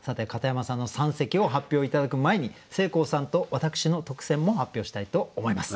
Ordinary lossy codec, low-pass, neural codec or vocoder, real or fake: none; none; none; real